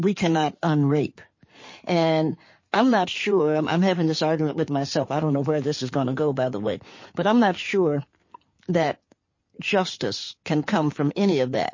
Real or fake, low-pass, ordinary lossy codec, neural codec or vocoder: fake; 7.2 kHz; MP3, 32 kbps; codec, 16 kHz in and 24 kHz out, 2.2 kbps, FireRedTTS-2 codec